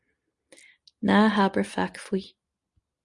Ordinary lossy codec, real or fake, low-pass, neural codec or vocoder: Opus, 64 kbps; real; 10.8 kHz; none